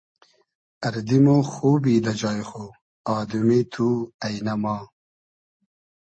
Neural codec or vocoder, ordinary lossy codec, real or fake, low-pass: none; MP3, 32 kbps; real; 9.9 kHz